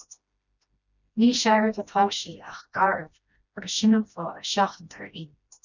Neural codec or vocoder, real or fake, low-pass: codec, 16 kHz, 1 kbps, FreqCodec, smaller model; fake; 7.2 kHz